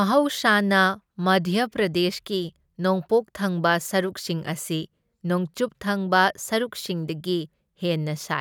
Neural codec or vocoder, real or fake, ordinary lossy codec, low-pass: none; real; none; none